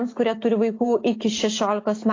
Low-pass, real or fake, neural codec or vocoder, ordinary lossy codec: 7.2 kHz; real; none; AAC, 32 kbps